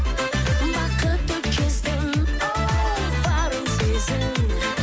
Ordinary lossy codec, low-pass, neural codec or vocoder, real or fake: none; none; none; real